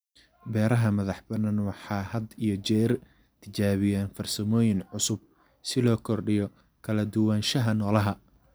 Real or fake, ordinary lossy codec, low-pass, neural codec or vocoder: real; none; none; none